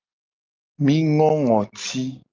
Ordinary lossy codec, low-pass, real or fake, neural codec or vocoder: Opus, 24 kbps; 7.2 kHz; real; none